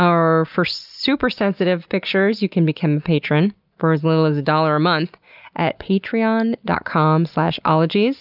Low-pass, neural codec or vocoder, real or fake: 5.4 kHz; none; real